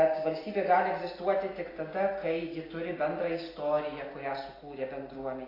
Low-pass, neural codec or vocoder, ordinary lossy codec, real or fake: 5.4 kHz; none; AAC, 24 kbps; real